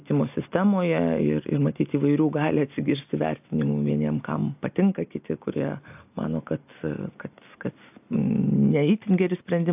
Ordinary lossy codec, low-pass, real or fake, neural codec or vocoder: AAC, 32 kbps; 3.6 kHz; real; none